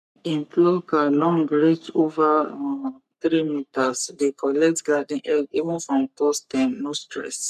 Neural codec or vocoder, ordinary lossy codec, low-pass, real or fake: codec, 44.1 kHz, 3.4 kbps, Pupu-Codec; none; 14.4 kHz; fake